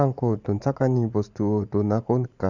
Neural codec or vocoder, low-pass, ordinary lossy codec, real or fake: vocoder, 44.1 kHz, 80 mel bands, Vocos; 7.2 kHz; none; fake